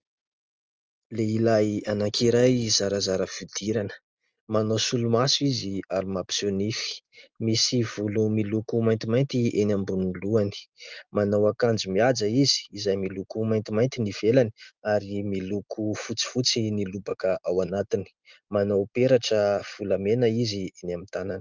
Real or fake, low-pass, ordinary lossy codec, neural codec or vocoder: real; 7.2 kHz; Opus, 24 kbps; none